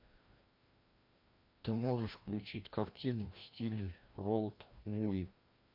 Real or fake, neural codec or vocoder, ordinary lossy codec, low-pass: fake; codec, 16 kHz, 1 kbps, FreqCodec, larger model; MP3, 32 kbps; 5.4 kHz